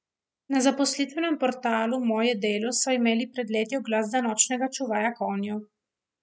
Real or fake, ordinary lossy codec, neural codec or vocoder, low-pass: real; none; none; none